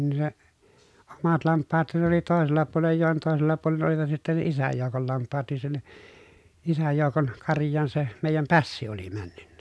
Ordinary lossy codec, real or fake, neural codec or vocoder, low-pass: none; real; none; none